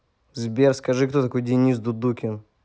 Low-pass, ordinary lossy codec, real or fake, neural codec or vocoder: none; none; real; none